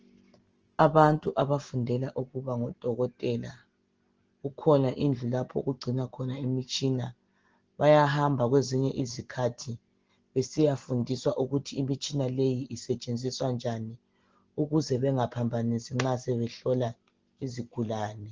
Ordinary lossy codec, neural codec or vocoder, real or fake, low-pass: Opus, 16 kbps; none; real; 7.2 kHz